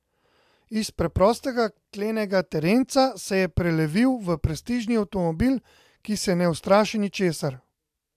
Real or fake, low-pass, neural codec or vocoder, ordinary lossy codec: real; 14.4 kHz; none; AAC, 96 kbps